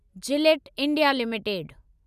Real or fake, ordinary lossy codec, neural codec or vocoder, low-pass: real; none; none; 14.4 kHz